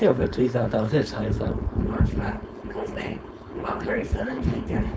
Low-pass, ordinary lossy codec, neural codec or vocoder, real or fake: none; none; codec, 16 kHz, 4.8 kbps, FACodec; fake